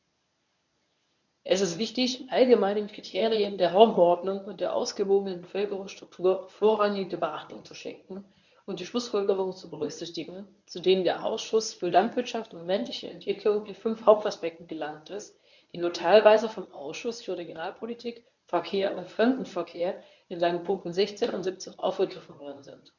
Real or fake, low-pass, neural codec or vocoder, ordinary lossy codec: fake; 7.2 kHz; codec, 24 kHz, 0.9 kbps, WavTokenizer, medium speech release version 1; none